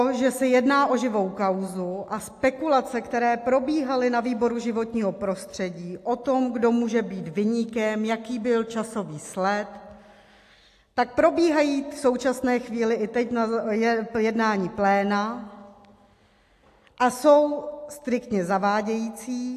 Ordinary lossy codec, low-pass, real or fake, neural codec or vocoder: AAC, 64 kbps; 14.4 kHz; real; none